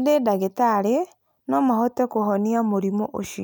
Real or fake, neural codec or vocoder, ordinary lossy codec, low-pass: real; none; none; none